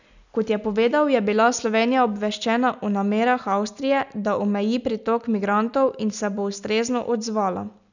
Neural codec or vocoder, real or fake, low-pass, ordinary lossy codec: none; real; 7.2 kHz; none